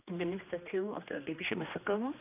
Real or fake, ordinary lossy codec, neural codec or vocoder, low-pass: fake; none; codec, 16 kHz, 2 kbps, X-Codec, HuBERT features, trained on general audio; 3.6 kHz